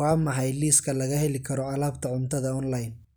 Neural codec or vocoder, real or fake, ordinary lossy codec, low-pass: none; real; none; none